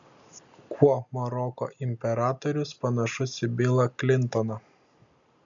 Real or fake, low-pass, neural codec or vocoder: real; 7.2 kHz; none